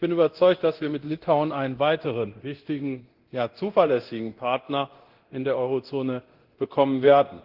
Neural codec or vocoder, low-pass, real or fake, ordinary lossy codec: codec, 24 kHz, 0.9 kbps, DualCodec; 5.4 kHz; fake; Opus, 16 kbps